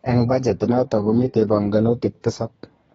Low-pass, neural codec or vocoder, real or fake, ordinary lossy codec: 14.4 kHz; codec, 32 kHz, 1.9 kbps, SNAC; fake; AAC, 24 kbps